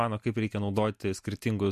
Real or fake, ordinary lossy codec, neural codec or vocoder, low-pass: real; MP3, 64 kbps; none; 14.4 kHz